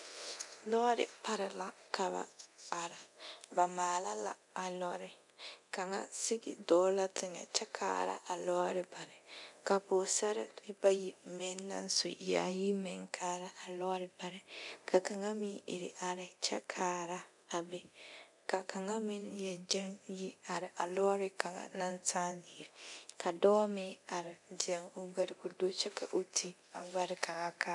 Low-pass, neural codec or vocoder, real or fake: 10.8 kHz; codec, 24 kHz, 0.9 kbps, DualCodec; fake